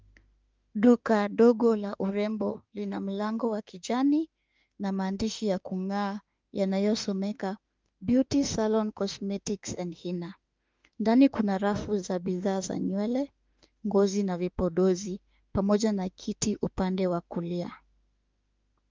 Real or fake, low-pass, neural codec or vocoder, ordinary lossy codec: fake; 7.2 kHz; autoencoder, 48 kHz, 32 numbers a frame, DAC-VAE, trained on Japanese speech; Opus, 24 kbps